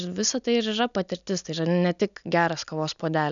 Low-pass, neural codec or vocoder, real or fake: 7.2 kHz; none; real